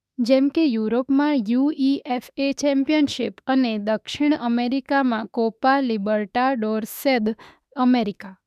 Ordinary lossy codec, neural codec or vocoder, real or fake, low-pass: none; autoencoder, 48 kHz, 32 numbers a frame, DAC-VAE, trained on Japanese speech; fake; 14.4 kHz